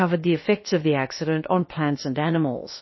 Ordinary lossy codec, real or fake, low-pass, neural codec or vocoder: MP3, 24 kbps; fake; 7.2 kHz; codec, 16 kHz, about 1 kbps, DyCAST, with the encoder's durations